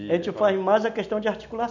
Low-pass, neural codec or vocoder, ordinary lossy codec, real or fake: 7.2 kHz; none; none; real